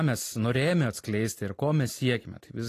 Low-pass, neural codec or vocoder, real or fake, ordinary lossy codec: 14.4 kHz; none; real; AAC, 48 kbps